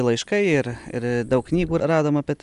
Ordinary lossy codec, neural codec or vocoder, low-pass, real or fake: MP3, 96 kbps; none; 10.8 kHz; real